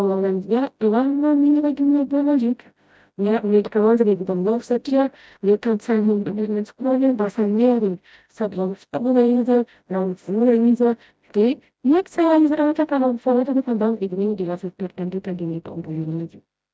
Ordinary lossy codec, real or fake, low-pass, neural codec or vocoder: none; fake; none; codec, 16 kHz, 0.5 kbps, FreqCodec, smaller model